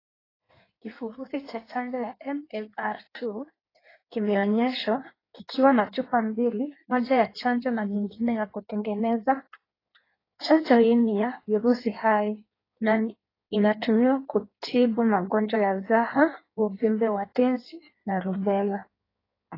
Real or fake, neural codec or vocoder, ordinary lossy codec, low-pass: fake; codec, 16 kHz in and 24 kHz out, 1.1 kbps, FireRedTTS-2 codec; AAC, 24 kbps; 5.4 kHz